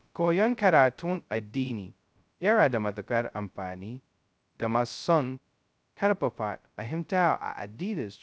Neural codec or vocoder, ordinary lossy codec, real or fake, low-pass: codec, 16 kHz, 0.2 kbps, FocalCodec; none; fake; none